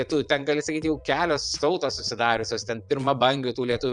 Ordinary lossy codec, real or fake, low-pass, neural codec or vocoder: MP3, 96 kbps; fake; 9.9 kHz; vocoder, 22.05 kHz, 80 mel bands, Vocos